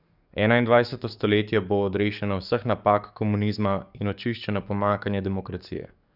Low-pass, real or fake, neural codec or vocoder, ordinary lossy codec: 5.4 kHz; fake; codec, 44.1 kHz, 7.8 kbps, DAC; none